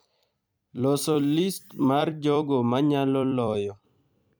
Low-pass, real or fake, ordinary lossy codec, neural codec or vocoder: none; fake; none; vocoder, 44.1 kHz, 128 mel bands every 256 samples, BigVGAN v2